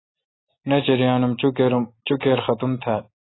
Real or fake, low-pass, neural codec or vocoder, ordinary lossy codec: real; 7.2 kHz; none; AAC, 16 kbps